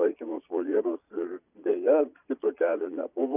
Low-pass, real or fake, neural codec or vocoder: 3.6 kHz; fake; vocoder, 22.05 kHz, 80 mel bands, Vocos